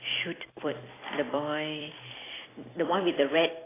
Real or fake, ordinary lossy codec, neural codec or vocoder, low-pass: fake; AAC, 16 kbps; vocoder, 44.1 kHz, 128 mel bands every 256 samples, BigVGAN v2; 3.6 kHz